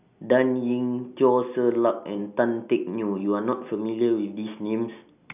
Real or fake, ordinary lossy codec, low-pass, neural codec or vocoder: real; none; 3.6 kHz; none